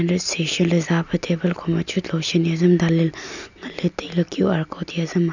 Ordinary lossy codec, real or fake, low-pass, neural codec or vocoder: none; real; 7.2 kHz; none